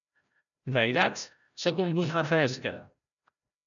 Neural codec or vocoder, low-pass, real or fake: codec, 16 kHz, 0.5 kbps, FreqCodec, larger model; 7.2 kHz; fake